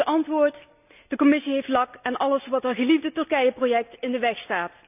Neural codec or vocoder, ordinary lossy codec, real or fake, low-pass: none; none; real; 3.6 kHz